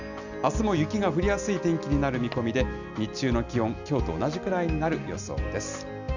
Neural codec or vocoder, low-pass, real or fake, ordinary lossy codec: none; 7.2 kHz; real; none